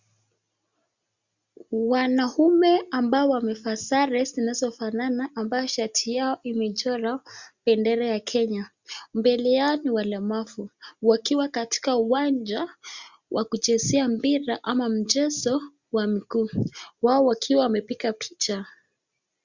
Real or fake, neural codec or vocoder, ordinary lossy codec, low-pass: real; none; Opus, 64 kbps; 7.2 kHz